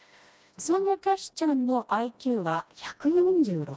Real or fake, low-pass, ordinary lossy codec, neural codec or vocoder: fake; none; none; codec, 16 kHz, 1 kbps, FreqCodec, smaller model